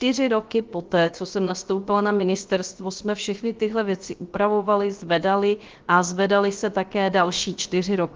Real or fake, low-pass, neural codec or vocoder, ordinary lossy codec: fake; 7.2 kHz; codec, 16 kHz, 0.7 kbps, FocalCodec; Opus, 32 kbps